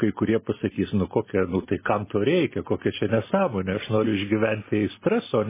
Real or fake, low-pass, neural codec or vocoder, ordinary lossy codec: real; 3.6 kHz; none; MP3, 16 kbps